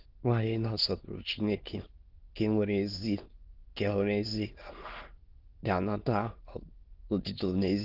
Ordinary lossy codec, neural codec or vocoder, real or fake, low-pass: Opus, 16 kbps; autoencoder, 22.05 kHz, a latent of 192 numbers a frame, VITS, trained on many speakers; fake; 5.4 kHz